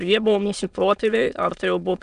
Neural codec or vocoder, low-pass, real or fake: autoencoder, 22.05 kHz, a latent of 192 numbers a frame, VITS, trained on many speakers; 9.9 kHz; fake